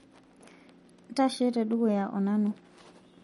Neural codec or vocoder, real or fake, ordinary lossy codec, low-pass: none; real; MP3, 48 kbps; 19.8 kHz